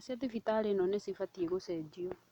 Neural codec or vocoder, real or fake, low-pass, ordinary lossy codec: none; real; 19.8 kHz; none